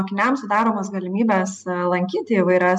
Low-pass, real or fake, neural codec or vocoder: 10.8 kHz; real; none